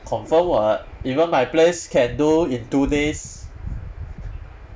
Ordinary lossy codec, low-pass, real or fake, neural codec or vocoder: none; none; real; none